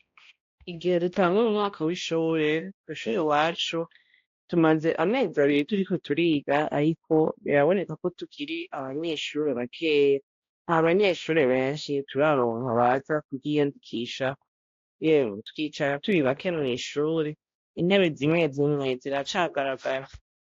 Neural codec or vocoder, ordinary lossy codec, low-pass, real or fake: codec, 16 kHz, 1 kbps, X-Codec, HuBERT features, trained on balanced general audio; AAC, 48 kbps; 7.2 kHz; fake